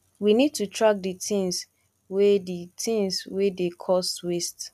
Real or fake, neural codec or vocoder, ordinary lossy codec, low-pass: real; none; none; 14.4 kHz